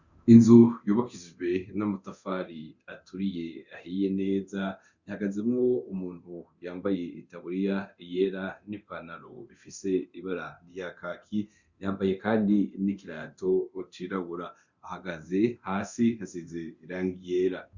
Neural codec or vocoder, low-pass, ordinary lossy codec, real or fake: codec, 24 kHz, 0.9 kbps, DualCodec; 7.2 kHz; Opus, 64 kbps; fake